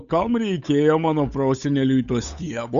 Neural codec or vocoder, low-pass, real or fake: codec, 16 kHz, 8 kbps, FunCodec, trained on Chinese and English, 25 frames a second; 7.2 kHz; fake